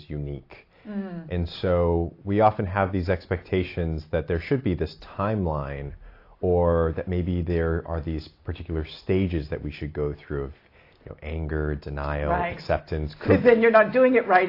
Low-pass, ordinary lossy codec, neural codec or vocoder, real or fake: 5.4 kHz; AAC, 32 kbps; none; real